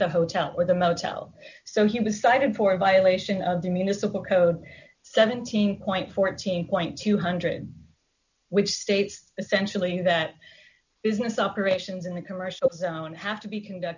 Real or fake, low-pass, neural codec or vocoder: real; 7.2 kHz; none